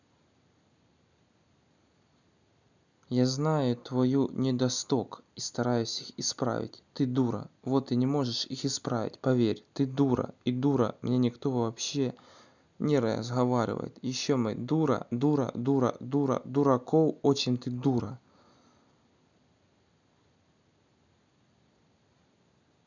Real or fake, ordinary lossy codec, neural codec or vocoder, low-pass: real; none; none; 7.2 kHz